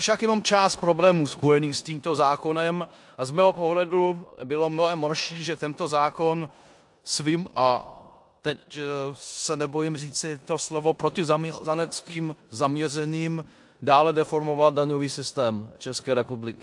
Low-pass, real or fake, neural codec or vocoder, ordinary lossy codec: 10.8 kHz; fake; codec, 16 kHz in and 24 kHz out, 0.9 kbps, LongCat-Audio-Codec, four codebook decoder; AAC, 64 kbps